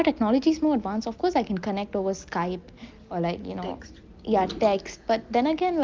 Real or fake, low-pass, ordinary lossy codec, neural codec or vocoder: real; 7.2 kHz; Opus, 32 kbps; none